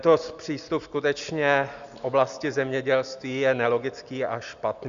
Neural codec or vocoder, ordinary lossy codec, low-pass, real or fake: none; Opus, 64 kbps; 7.2 kHz; real